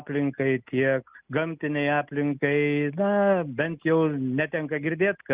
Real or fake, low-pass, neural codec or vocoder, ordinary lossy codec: real; 3.6 kHz; none; Opus, 24 kbps